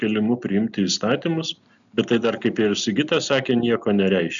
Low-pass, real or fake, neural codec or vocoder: 7.2 kHz; real; none